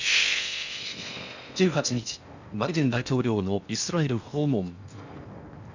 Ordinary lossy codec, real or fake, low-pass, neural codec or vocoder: none; fake; 7.2 kHz; codec, 16 kHz in and 24 kHz out, 0.6 kbps, FocalCodec, streaming, 4096 codes